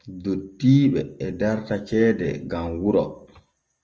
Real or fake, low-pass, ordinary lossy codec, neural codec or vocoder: real; 7.2 kHz; Opus, 24 kbps; none